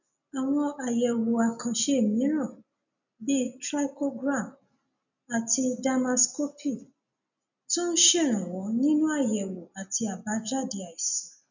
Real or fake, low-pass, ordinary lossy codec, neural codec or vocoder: real; 7.2 kHz; none; none